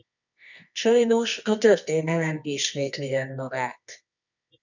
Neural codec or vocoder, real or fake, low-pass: codec, 24 kHz, 0.9 kbps, WavTokenizer, medium music audio release; fake; 7.2 kHz